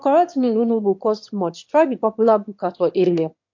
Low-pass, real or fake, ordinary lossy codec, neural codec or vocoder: 7.2 kHz; fake; MP3, 48 kbps; autoencoder, 22.05 kHz, a latent of 192 numbers a frame, VITS, trained on one speaker